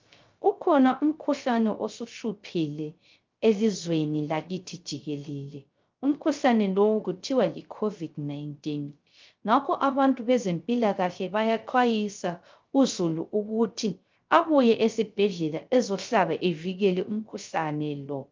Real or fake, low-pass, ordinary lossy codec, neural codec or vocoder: fake; 7.2 kHz; Opus, 24 kbps; codec, 16 kHz, 0.3 kbps, FocalCodec